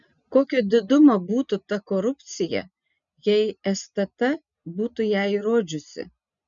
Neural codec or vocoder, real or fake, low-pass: none; real; 7.2 kHz